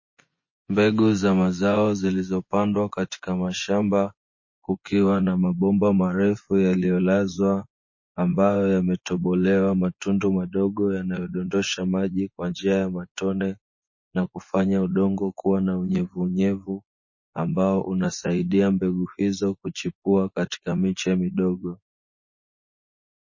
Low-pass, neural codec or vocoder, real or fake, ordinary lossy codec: 7.2 kHz; vocoder, 24 kHz, 100 mel bands, Vocos; fake; MP3, 32 kbps